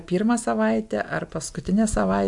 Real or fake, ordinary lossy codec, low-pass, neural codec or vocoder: real; MP3, 64 kbps; 10.8 kHz; none